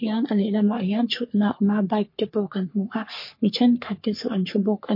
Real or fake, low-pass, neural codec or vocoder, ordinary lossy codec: fake; 5.4 kHz; codec, 44.1 kHz, 3.4 kbps, Pupu-Codec; MP3, 32 kbps